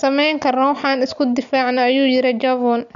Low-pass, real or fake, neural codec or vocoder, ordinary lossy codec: 7.2 kHz; real; none; none